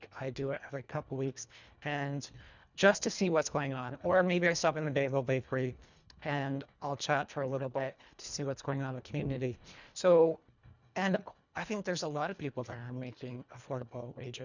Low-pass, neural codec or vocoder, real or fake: 7.2 kHz; codec, 24 kHz, 1.5 kbps, HILCodec; fake